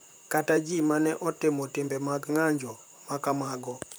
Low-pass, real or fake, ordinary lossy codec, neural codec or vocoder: none; fake; none; vocoder, 44.1 kHz, 128 mel bands, Pupu-Vocoder